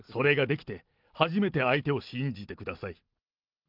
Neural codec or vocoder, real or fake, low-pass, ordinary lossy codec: none; real; 5.4 kHz; Opus, 32 kbps